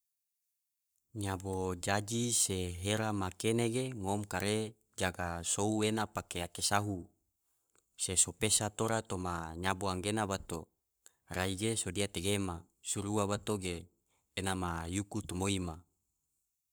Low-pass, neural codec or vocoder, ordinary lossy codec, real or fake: none; codec, 44.1 kHz, 7.8 kbps, Pupu-Codec; none; fake